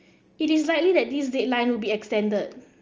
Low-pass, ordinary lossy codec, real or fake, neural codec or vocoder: 7.2 kHz; Opus, 24 kbps; real; none